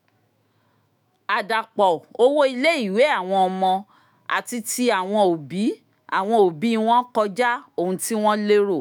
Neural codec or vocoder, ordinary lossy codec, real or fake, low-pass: autoencoder, 48 kHz, 128 numbers a frame, DAC-VAE, trained on Japanese speech; none; fake; none